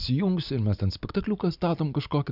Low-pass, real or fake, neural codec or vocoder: 5.4 kHz; real; none